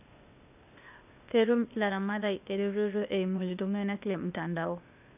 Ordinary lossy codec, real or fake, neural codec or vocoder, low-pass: none; fake; codec, 16 kHz, 0.8 kbps, ZipCodec; 3.6 kHz